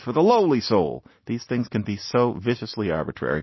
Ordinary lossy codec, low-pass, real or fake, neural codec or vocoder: MP3, 24 kbps; 7.2 kHz; real; none